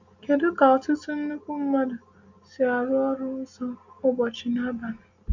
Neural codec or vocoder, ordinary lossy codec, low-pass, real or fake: none; MP3, 64 kbps; 7.2 kHz; real